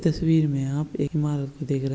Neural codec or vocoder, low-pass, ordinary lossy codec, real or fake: none; none; none; real